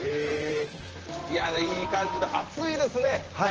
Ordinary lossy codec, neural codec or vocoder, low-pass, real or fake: Opus, 16 kbps; vocoder, 44.1 kHz, 128 mel bands, Pupu-Vocoder; 7.2 kHz; fake